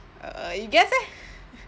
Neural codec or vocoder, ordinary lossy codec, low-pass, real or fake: none; none; none; real